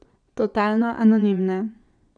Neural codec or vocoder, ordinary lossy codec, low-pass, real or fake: vocoder, 22.05 kHz, 80 mel bands, Vocos; none; 9.9 kHz; fake